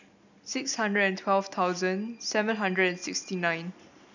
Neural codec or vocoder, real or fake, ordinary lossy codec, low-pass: none; real; none; 7.2 kHz